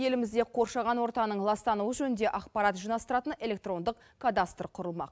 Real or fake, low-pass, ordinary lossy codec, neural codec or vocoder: real; none; none; none